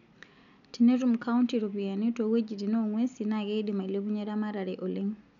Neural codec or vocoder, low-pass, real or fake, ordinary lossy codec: none; 7.2 kHz; real; none